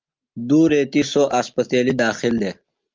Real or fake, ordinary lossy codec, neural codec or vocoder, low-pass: real; Opus, 24 kbps; none; 7.2 kHz